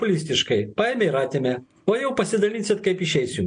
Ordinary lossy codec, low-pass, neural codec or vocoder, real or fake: MP3, 64 kbps; 9.9 kHz; none; real